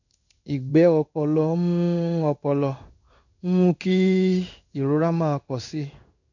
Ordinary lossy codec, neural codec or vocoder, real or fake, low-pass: none; codec, 16 kHz in and 24 kHz out, 1 kbps, XY-Tokenizer; fake; 7.2 kHz